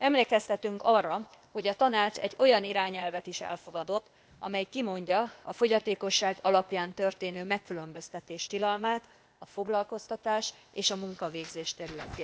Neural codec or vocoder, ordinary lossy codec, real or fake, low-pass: codec, 16 kHz, 0.8 kbps, ZipCodec; none; fake; none